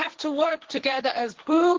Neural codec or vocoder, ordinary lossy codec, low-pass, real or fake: codec, 16 kHz, 1.1 kbps, Voila-Tokenizer; Opus, 16 kbps; 7.2 kHz; fake